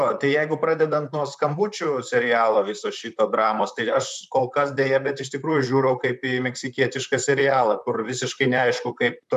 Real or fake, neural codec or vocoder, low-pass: fake; vocoder, 44.1 kHz, 128 mel bands, Pupu-Vocoder; 14.4 kHz